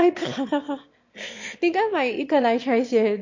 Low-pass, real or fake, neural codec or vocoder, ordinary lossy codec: 7.2 kHz; fake; autoencoder, 22.05 kHz, a latent of 192 numbers a frame, VITS, trained on one speaker; MP3, 48 kbps